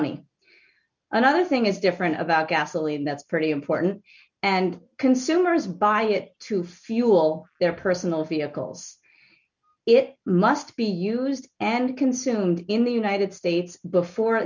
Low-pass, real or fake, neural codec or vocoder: 7.2 kHz; real; none